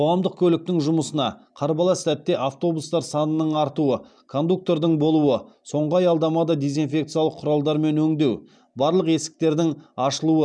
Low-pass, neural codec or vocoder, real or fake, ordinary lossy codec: 9.9 kHz; none; real; none